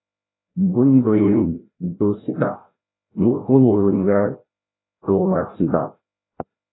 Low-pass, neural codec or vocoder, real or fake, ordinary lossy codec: 7.2 kHz; codec, 16 kHz, 0.5 kbps, FreqCodec, larger model; fake; AAC, 16 kbps